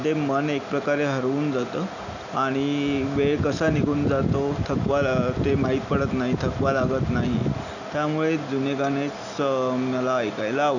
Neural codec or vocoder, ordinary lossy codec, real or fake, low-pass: none; none; real; 7.2 kHz